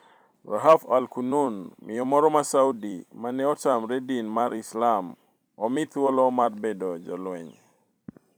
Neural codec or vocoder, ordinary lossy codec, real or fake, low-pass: vocoder, 44.1 kHz, 128 mel bands every 256 samples, BigVGAN v2; none; fake; none